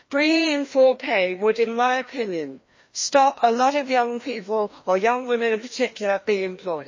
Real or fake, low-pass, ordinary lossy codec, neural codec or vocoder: fake; 7.2 kHz; MP3, 32 kbps; codec, 16 kHz, 1 kbps, FreqCodec, larger model